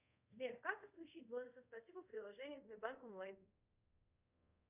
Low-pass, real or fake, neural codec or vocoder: 3.6 kHz; fake; codec, 24 kHz, 0.5 kbps, DualCodec